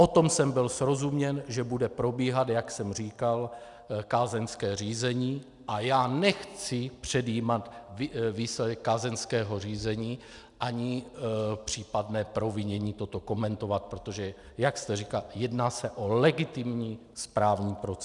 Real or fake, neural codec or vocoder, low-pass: real; none; 10.8 kHz